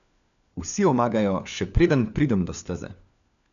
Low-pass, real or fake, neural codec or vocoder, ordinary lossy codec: 7.2 kHz; fake; codec, 16 kHz, 4 kbps, FunCodec, trained on LibriTTS, 50 frames a second; none